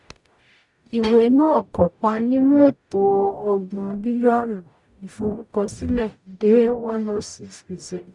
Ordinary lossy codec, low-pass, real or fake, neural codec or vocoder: none; 10.8 kHz; fake; codec, 44.1 kHz, 0.9 kbps, DAC